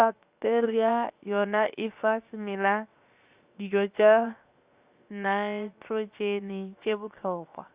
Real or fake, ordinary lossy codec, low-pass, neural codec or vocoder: fake; Opus, 64 kbps; 3.6 kHz; codec, 16 kHz, 0.7 kbps, FocalCodec